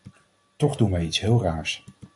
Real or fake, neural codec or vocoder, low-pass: real; none; 10.8 kHz